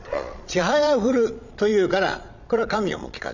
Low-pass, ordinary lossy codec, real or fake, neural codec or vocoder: 7.2 kHz; none; fake; vocoder, 22.05 kHz, 80 mel bands, Vocos